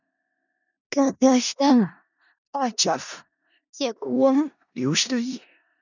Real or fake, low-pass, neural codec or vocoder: fake; 7.2 kHz; codec, 16 kHz in and 24 kHz out, 0.4 kbps, LongCat-Audio-Codec, four codebook decoder